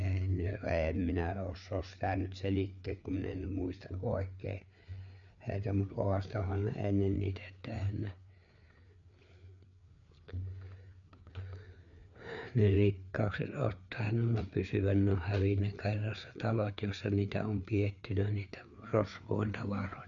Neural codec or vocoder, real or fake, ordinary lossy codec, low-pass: codec, 16 kHz, 4 kbps, FreqCodec, larger model; fake; none; 7.2 kHz